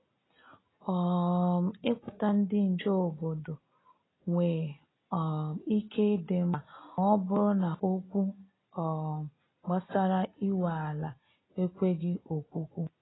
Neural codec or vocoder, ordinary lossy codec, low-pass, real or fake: none; AAC, 16 kbps; 7.2 kHz; real